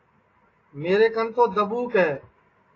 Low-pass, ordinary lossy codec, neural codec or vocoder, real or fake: 7.2 kHz; AAC, 32 kbps; none; real